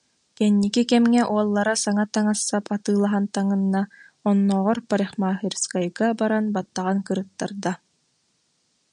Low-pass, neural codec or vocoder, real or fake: 9.9 kHz; none; real